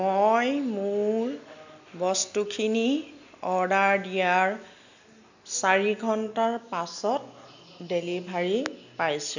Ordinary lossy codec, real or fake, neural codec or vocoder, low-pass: none; real; none; 7.2 kHz